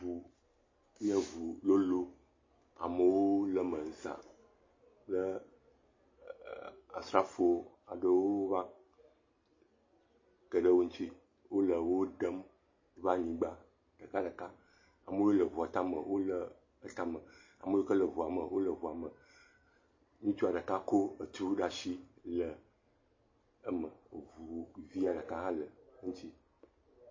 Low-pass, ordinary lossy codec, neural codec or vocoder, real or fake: 7.2 kHz; MP3, 32 kbps; none; real